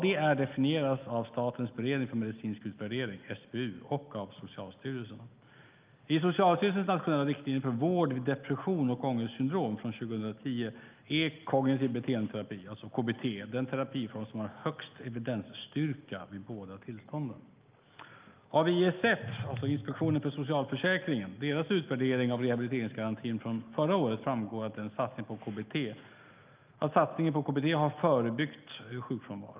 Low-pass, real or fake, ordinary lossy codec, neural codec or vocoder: 3.6 kHz; real; Opus, 24 kbps; none